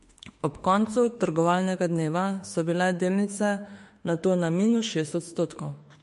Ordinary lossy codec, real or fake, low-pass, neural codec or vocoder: MP3, 48 kbps; fake; 14.4 kHz; autoencoder, 48 kHz, 32 numbers a frame, DAC-VAE, trained on Japanese speech